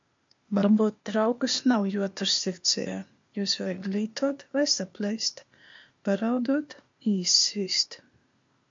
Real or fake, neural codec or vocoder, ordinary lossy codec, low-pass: fake; codec, 16 kHz, 0.8 kbps, ZipCodec; MP3, 48 kbps; 7.2 kHz